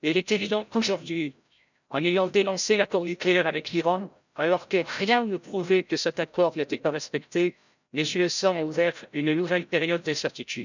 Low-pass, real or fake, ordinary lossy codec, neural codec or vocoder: 7.2 kHz; fake; none; codec, 16 kHz, 0.5 kbps, FreqCodec, larger model